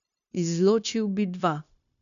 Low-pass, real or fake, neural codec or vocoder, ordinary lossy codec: 7.2 kHz; fake; codec, 16 kHz, 0.9 kbps, LongCat-Audio-Codec; none